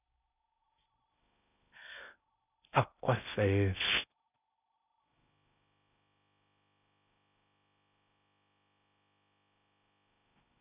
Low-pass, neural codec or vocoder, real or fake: 3.6 kHz; codec, 16 kHz in and 24 kHz out, 0.6 kbps, FocalCodec, streaming, 4096 codes; fake